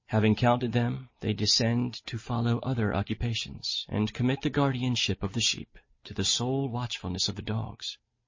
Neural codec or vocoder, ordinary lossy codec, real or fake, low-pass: none; MP3, 32 kbps; real; 7.2 kHz